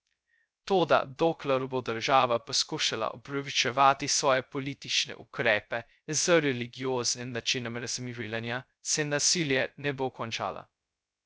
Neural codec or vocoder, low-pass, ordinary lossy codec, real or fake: codec, 16 kHz, 0.2 kbps, FocalCodec; none; none; fake